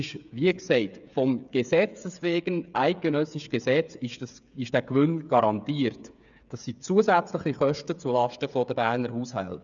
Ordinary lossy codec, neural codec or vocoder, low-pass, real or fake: none; codec, 16 kHz, 8 kbps, FreqCodec, smaller model; 7.2 kHz; fake